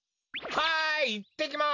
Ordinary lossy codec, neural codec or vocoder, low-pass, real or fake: none; none; 7.2 kHz; real